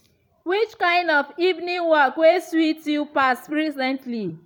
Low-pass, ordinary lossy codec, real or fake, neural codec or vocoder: 19.8 kHz; none; fake; vocoder, 44.1 kHz, 128 mel bands every 256 samples, BigVGAN v2